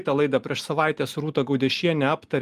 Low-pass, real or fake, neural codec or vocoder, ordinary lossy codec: 14.4 kHz; real; none; Opus, 32 kbps